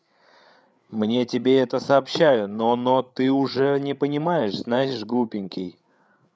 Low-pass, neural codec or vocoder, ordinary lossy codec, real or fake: none; codec, 16 kHz, 8 kbps, FreqCodec, larger model; none; fake